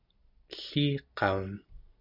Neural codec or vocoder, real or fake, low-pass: none; real; 5.4 kHz